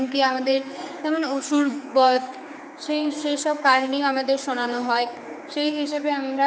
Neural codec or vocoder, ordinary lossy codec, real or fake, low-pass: codec, 16 kHz, 4 kbps, X-Codec, HuBERT features, trained on general audio; none; fake; none